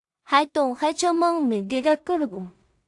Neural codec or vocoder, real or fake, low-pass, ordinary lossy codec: codec, 16 kHz in and 24 kHz out, 0.4 kbps, LongCat-Audio-Codec, two codebook decoder; fake; 10.8 kHz; AAC, 64 kbps